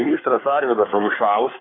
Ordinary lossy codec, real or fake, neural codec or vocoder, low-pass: AAC, 16 kbps; fake; codec, 24 kHz, 1 kbps, SNAC; 7.2 kHz